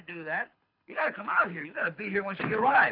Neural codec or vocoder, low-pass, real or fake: codec, 24 kHz, 6 kbps, HILCodec; 5.4 kHz; fake